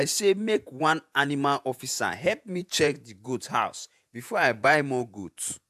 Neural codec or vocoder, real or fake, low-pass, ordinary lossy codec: vocoder, 48 kHz, 128 mel bands, Vocos; fake; 14.4 kHz; AAC, 96 kbps